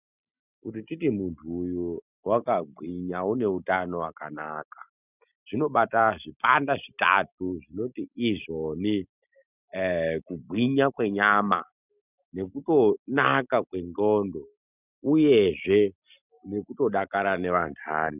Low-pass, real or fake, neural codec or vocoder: 3.6 kHz; real; none